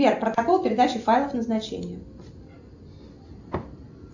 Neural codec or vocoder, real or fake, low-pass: none; real; 7.2 kHz